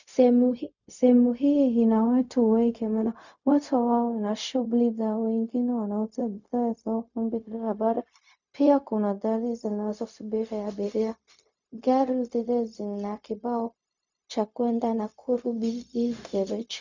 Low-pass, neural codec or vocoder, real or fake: 7.2 kHz; codec, 16 kHz, 0.4 kbps, LongCat-Audio-Codec; fake